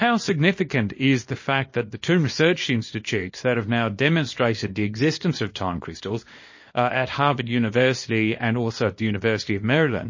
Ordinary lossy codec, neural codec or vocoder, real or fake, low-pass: MP3, 32 kbps; codec, 24 kHz, 0.9 kbps, WavTokenizer, small release; fake; 7.2 kHz